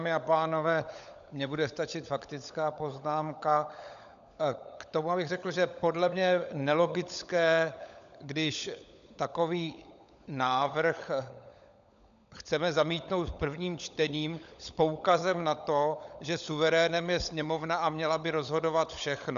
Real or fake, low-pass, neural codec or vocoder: fake; 7.2 kHz; codec, 16 kHz, 16 kbps, FunCodec, trained on LibriTTS, 50 frames a second